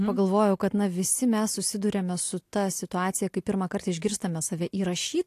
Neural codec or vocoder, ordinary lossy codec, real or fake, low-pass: none; AAC, 64 kbps; real; 14.4 kHz